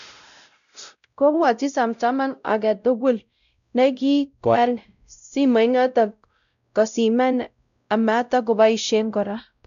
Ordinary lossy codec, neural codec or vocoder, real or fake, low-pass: none; codec, 16 kHz, 0.5 kbps, X-Codec, WavLM features, trained on Multilingual LibriSpeech; fake; 7.2 kHz